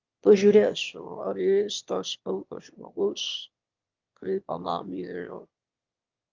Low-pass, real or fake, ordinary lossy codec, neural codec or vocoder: 7.2 kHz; fake; Opus, 24 kbps; autoencoder, 22.05 kHz, a latent of 192 numbers a frame, VITS, trained on one speaker